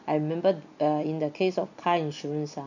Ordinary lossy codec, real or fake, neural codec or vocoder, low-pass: none; real; none; 7.2 kHz